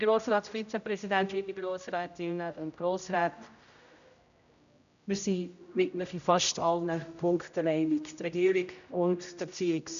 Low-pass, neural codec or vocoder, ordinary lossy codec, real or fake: 7.2 kHz; codec, 16 kHz, 0.5 kbps, X-Codec, HuBERT features, trained on general audio; none; fake